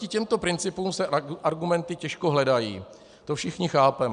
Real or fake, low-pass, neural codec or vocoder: real; 9.9 kHz; none